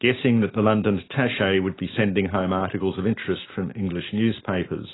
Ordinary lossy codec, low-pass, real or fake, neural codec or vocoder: AAC, 16 kbps; 7.2 kHz; real; none